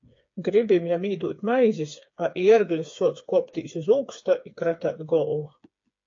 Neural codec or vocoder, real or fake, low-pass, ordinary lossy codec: codec, 16 kHz, 4 kbps, FreqCodec, smaller model; fake; 7.2 kHz; AAC, 48 kbps